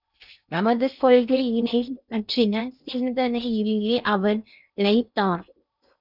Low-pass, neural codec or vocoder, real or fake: 5.4 kHz; codec, 16 kHz in and 24 kHz out, 0.6 kbps, FocalCodec, streaming, 2048 codes; fake